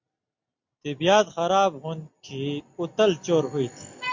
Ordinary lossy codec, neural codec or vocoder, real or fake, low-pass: MP3, 48 kbps; none; real; 7.2 kHz